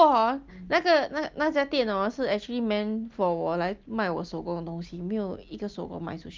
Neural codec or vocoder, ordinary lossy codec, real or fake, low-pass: none; Opus, 32 kbps; real; 7.2 kHz